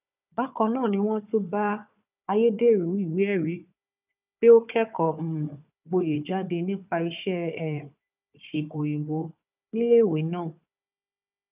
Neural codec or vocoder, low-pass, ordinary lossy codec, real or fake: codec, 16 kHz, 4 kbps, FunCodec, trained on Chinese and English, 50 frames a second; 3.6 kHz; none; fake